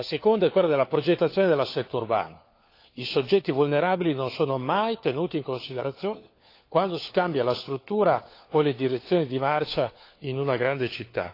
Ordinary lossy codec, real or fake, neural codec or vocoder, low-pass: AAC, 32 kbps; fake; codec, 16 kHz, 4 kbps, FunCodec, trained on LibriTTS, 50 frames a second; 5.4 kHz